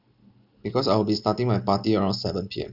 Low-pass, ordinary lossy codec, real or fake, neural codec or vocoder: 5.4 kHz; none; real; none